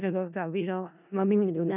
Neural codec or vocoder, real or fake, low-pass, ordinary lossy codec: codec, 16 kHz in and 24 kHz out, 0.4 kbps, LongCat-Audio-Codec, four codebook decoder; fake; 3.6 kHz; none